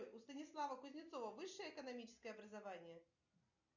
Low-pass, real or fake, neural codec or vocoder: 7.2 kHz; real; none